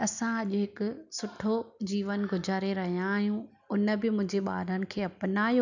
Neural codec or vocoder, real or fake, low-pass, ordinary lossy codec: none; real; 7.2 kHz; none